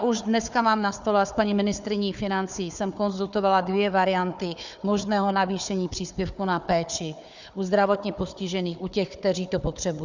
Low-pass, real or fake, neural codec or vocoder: 7.2 kHz; fake; codec, 16 kHz, 4 kbps, FunCodec, trained on Chinese and English, 50 frames a second